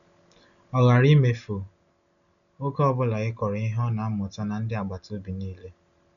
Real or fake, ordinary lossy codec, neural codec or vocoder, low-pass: real; none; none; 7.2 kHz